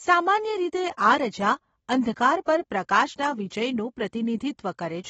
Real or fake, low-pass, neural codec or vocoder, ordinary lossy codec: real; 19.8 kHz; none; AAC, 24 kbps